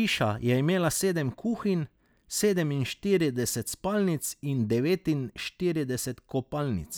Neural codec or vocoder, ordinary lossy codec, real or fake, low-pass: none; none; real; none